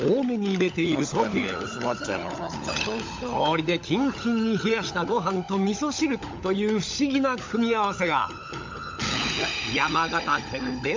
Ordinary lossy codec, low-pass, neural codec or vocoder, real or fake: MP3, 64 kbps; 7.2 kHz; codec, 16 kHz, 16 kbps, FunCodec, trained on LibriTTS, 50 frames a second; fake